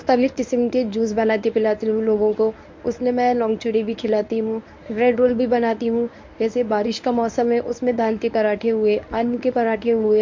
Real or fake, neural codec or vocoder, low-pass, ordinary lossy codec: fake; codec, 24 kHz, 0.9 kbps, WavTokenizer, medium speech release version 1; 7.2 kHz; MP3, 48 kbps